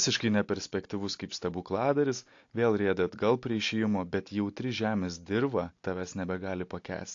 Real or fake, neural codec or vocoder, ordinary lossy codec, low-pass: real; none; AAC, 48 kbps; 7.2 kHz